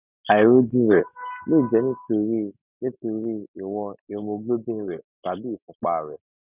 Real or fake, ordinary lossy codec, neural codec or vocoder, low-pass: real; none; none; 3.6 kHz